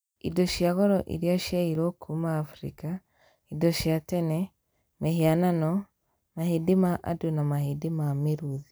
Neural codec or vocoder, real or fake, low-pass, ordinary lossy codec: none; real; none; none